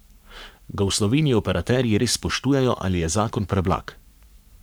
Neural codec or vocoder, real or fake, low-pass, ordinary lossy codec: codec, 44.1 kHz, 7.8 kbps, Pupu-Codec; fake; none; none